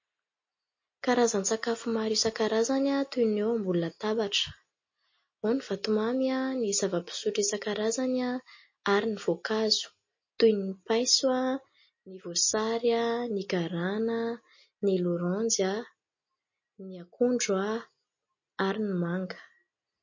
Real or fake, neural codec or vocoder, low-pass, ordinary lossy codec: real; none; 7.2 kHz; MP3, 32 kbps